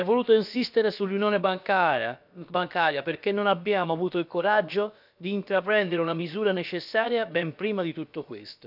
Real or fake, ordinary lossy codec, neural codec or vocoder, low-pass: fake; none; codec, 16 kHz, about 1 kbps, DyCAST, with the encoder's durations; 5.4 kHz